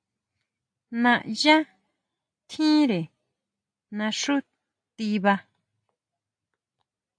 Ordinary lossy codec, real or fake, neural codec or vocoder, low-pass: AAC, 64 kbps; real; none; 9.9 kHz